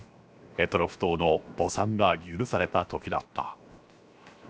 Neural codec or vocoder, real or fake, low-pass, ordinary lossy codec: codec, 16 kHz, 0.7 kbps, FocalCodec; fake; none; none